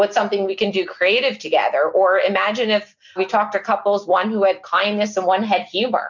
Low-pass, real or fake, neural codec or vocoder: 7.2 kHz; real; none